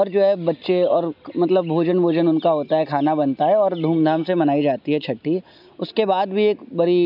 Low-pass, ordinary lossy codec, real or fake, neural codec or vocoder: 5.4 kHz; none; real; none